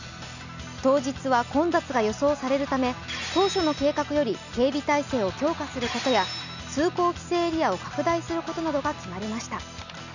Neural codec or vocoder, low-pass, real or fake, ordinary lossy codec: none; 7.2 kHz; real; none